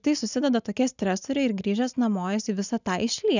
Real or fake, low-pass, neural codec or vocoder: real; 7.2 kHz; none